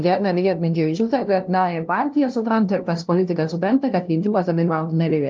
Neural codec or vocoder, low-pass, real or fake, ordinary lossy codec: codec, 16 kHz, 0.5 kbps, FunCodec, trained on LibriTTS, 25 frames a second; 7.2 kHz; fake; Opus, 32 kbps